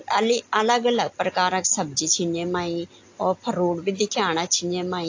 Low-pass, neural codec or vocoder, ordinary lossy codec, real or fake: 7.2 kHz; none; AAC, 48 kbps; real